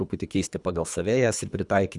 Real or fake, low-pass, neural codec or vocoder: fake; 10.8 kHz; codec, 24 kHz, 3 kbps, HILCodec